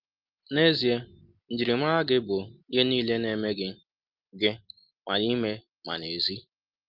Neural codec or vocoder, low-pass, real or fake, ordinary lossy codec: none; 5.4 kHz; real; Opus, 32 kbps